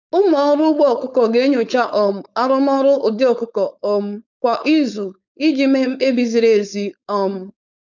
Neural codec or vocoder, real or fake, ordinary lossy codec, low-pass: codec, 16 kHz, 4.8 kbps, FACodec; fake; none; 7.2 kHz